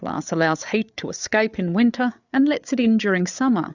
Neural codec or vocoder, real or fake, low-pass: codec, 16 kHz, 8 kbps, FreqCodec, larger model; fake; 7.2 kHz